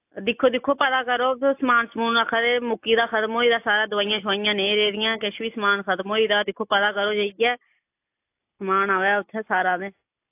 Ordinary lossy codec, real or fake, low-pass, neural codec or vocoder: AAC, 32 kbps; real; 3.6 kHz; none